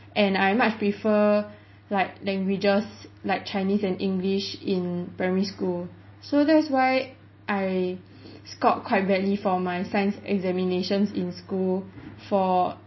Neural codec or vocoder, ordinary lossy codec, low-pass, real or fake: none; MP3, 24 kbps; 7.2 kHz; real